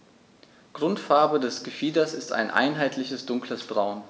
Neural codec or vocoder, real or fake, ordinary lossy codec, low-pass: none; real; none; none